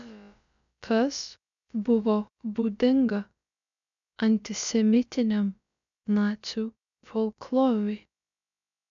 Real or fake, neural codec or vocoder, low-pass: fake; codec, 16 kHz, about 1 kbps, DyCAST, with the encoder's durations; 7.2 kHz